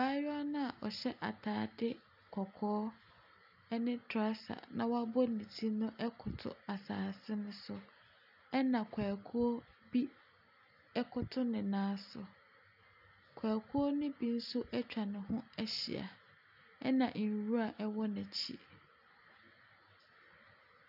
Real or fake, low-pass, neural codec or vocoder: real; 5.4 kHz; none